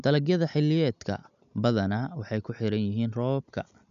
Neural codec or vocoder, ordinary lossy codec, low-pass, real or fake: none; none; 7.2 kHz; real